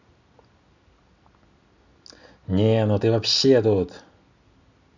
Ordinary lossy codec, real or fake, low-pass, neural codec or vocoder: none; real; 7.2 kHz; none